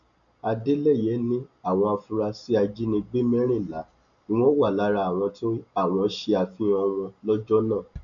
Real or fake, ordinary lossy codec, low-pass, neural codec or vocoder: real; none; 7.2 kHz; none